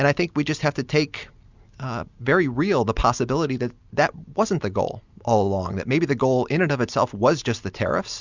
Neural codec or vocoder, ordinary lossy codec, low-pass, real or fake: none; Opus, 64 kbps; 7.2 kHz; real